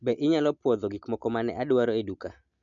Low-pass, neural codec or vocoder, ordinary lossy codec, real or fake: 7.2 kHz; none; none; real